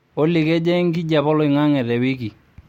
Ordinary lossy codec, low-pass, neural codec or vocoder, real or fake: MP3, 64 kbps; 19.8 kHz; none; real